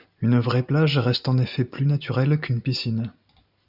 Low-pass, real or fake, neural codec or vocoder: 5.4 kHz; real; none